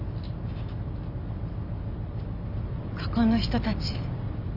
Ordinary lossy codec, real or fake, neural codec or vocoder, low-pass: none; real; none; 5.4 kHz